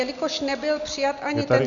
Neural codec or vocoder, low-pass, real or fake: none; 7.2 kHz; real